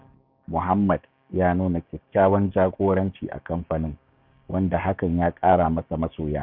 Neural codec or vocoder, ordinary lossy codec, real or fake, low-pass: codec, 16 kHz, 6 kbps, DAC; none; fake; 5.4 kHz